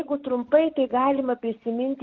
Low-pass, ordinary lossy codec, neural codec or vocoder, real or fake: 7.2 kHz; Opus, 32 kbps; none; real